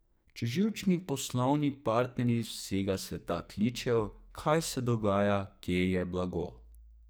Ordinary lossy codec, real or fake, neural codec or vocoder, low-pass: none; fake; codec, 44.1 kHz, 2.6 kbps, SNAC; none